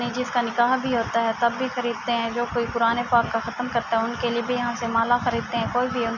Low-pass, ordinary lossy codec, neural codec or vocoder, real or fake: 7.2 kHz; none; none; real